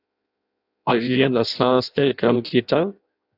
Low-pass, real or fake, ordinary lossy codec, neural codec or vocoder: 5.4 kHz; fake; AAC, 48 kbps; codec, 16 kHz in and 24 kHz out, 0.6 kbps, FireRedTTS-2 codec